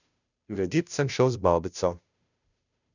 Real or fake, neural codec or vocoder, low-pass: fake; codec, 16 kHz, 0.5 kbps, FunCodec, trained on Chinese and English, 25 frames a second; 7.2 kHz